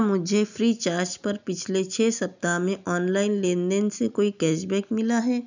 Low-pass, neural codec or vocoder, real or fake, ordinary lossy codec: 7.2 kHz; none; real; none